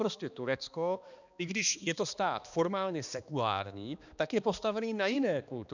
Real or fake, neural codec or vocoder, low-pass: fake; codec, 16 kHz, 2 kbps, X-Codec, HuBERT features, trained on balanced general audio; 7.2 kHz